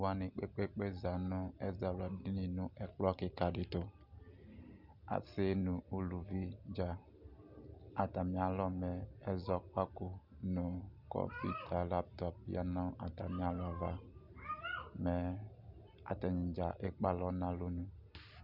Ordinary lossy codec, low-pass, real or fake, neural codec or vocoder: Opus, 64 kbps; 5.4 kHz; real; none